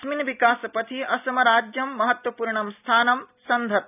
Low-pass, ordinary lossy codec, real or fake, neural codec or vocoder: 3.6 kHz; none; real; none